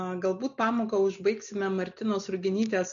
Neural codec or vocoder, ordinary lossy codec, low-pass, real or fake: none; MP3, 96 kbps; 7.2 kHz; real